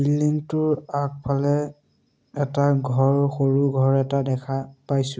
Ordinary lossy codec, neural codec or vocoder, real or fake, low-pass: none; none; real; none